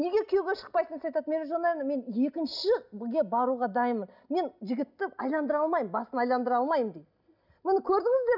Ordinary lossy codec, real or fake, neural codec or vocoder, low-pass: none; real; none; 5.4 kHz